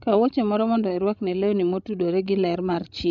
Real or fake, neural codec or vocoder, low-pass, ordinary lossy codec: fake; codec, 16 kHz, 16 kbps, FreqCodec, larger model; 7.2 kHz; none